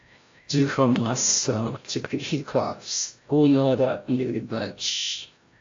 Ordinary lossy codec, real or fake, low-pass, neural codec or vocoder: AAC, 48 kbps; fake; 7.2 kHz; codec, 16 kHz, 0.5 kbps, FreqCodec, larger model